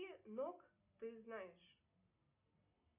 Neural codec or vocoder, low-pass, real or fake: none; 3.6 kHz; real